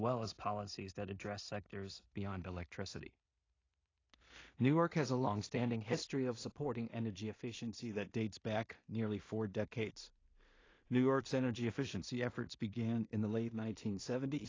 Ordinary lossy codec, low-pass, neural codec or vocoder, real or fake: AAC, 32 kbps; 7.2 kHz; codec, 16 kHz in and 24 kHz out, 0.4 kbps, LongCat-Audio-Codec, two codebook decoder; fake